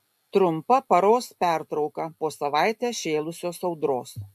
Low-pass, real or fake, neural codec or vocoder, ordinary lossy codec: 14.4 kHz; real; none; AAC, 96 kbps